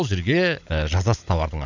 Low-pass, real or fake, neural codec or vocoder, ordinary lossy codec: 7.2 kHz; fake; codec, 16 kHz, 16 kbps, FunCodec, trained on LibriTTS, 50 frames a second; none